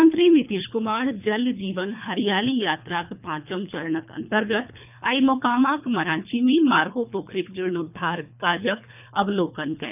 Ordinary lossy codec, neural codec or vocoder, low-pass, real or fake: none; codec, 24 kHz, 3 kbps, HILCodec; 3.6 kHz; fake